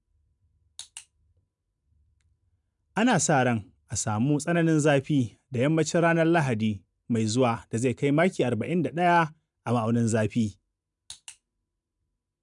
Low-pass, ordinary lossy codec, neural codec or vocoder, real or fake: 10.8 kHz; none; none; real